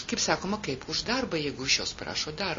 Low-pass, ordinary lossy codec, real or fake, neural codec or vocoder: 7.2 kHz; MP3, 32 kbps; real; none